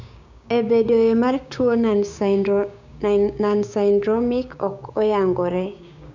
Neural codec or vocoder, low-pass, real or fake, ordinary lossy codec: codec, 16 kHz, 6 kbps, DAC; 7.2 kHz; fake; none